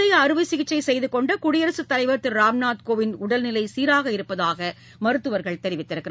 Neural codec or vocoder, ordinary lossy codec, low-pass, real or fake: none; none; none; real